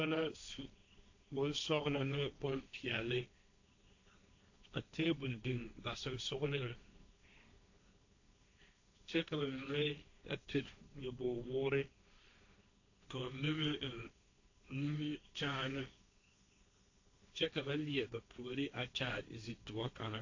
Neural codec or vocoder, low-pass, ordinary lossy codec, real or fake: codec, 16 kHz, 1.1 kbps, Voila-Tokenizer; 7.2 kHz; AAC, 48 kbps; fake